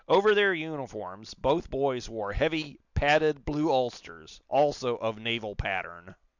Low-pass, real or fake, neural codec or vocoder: 7.2 kHz; real; none